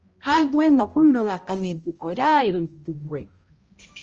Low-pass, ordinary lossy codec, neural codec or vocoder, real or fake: 7.2 kHz; Opus, 24 kbps; codec, 16 kHz, 0.5 kbps, X-Codec, HuBERT features, trained on balanced general audio; fake